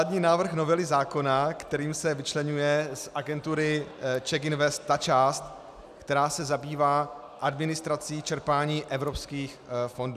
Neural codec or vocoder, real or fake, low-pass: none; real; 14.4 kHz